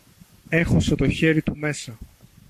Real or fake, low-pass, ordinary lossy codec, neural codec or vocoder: fake; 14.4 kHz; MP3, 64 kbps; codec, 44.1 kHz, 7.8 kbps, Pupu-Codec